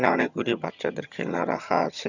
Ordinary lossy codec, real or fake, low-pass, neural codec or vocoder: none; fake; 7.2 kHz; vocoder, 22.05 kHz, 80 mel bands, HiFi-GAN